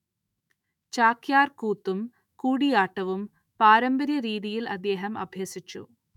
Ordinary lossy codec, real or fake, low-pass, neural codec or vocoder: none; fake; 19.8 kHz; autoencoder, 48 kHz, 128 numbers a frame, DAC-VAE, trained on Japanese speech